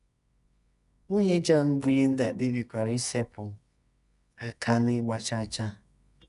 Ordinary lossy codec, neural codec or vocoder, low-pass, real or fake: none; codec, 24 kHz, 0.9 kbps, WavTokenizer, medium music audio release; 10.8 kHz; fake